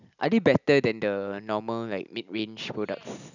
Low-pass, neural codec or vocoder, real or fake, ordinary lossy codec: 7.2 kHz; none; real; none